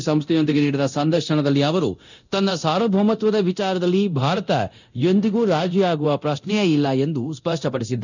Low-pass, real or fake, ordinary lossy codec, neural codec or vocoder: 7.2 kHz; fake; none; codec, 24 kHz, 0.9 kbps, DualCodec